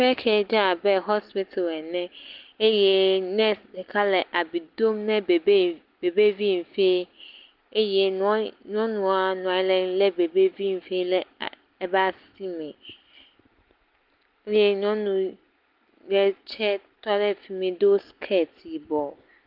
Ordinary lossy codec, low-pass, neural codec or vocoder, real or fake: Opus, 32 kbps; 5.4 kHz; none; real